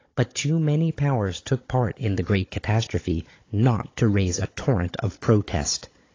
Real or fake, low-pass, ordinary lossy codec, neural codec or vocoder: fake; 7.2 kHz; AAC, 32 kbps; codec, 16 kHz, 16 kbps, FunCodec, trained on Chinese and English, 50 frames a second